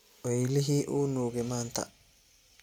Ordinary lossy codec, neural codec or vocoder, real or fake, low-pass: none; none; real; 19.8 kHz